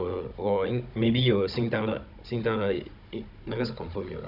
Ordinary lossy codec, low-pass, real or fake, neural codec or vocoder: none; 5.4 kHz; fake; codec, 16 kHz, 16 kbps, FunCodec, trained on Chinese and English, 50 frames a second